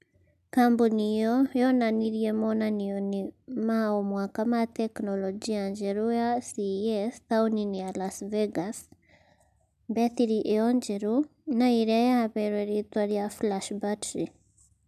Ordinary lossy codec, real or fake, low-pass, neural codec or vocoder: none; real; 14.4 kHz; none